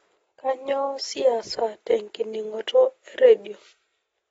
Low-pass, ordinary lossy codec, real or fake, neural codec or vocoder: 19.8 kHz; AAC, 24 kbps; real; none